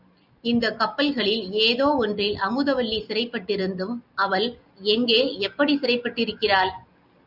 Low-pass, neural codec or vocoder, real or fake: 5.4 kHz; none; real